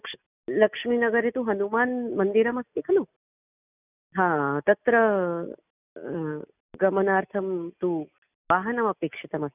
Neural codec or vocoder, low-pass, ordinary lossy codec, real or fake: none; 3.6 kHz; none; real